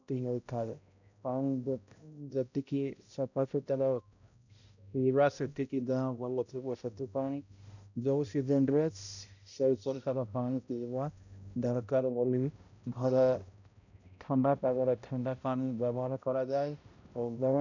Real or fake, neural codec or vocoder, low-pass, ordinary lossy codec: fake; codec, 16 kHz, 0.5 kbps, X-Codec, HuBERT features, trained on balanced general audio; 7.2 kHz; none